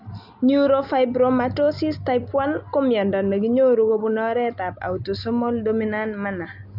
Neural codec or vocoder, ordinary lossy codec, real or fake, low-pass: none; none; real; 5.4 kHz